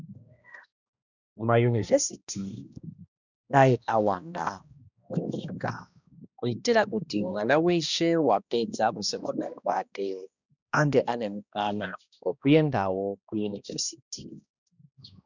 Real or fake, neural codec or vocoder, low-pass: fake; codec, 16 kHz, 1 kbps, X-Codec, HuBERT features, trained on balanced general audio; 7.2 kHz